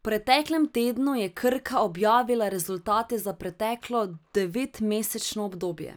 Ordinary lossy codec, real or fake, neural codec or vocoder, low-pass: none; real; none; none